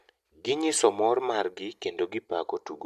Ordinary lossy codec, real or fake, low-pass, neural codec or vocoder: MP3, 96 kbps; real; 14.4 kHz; none